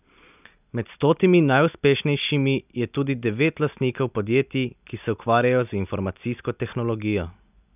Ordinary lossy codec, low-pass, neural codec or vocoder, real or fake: none; 3.6 kHz; none; real